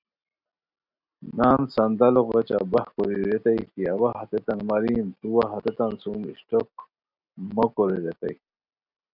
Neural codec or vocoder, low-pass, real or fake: none; 5.4 kHz; real